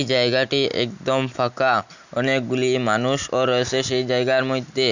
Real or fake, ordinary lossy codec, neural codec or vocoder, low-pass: real; none; none; 7.2 kHz